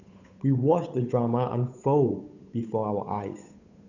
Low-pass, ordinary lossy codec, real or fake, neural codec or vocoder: 7.2 kHz; none; fake; codec, 16 kHz, 8 kbps, FunCodec, trained on Chinese and English, 25 frames a second